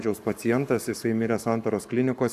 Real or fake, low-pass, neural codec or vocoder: fake; 14.4 kHz; codec, 44.1 kHz, 7.8 kbps, Pupu-Codec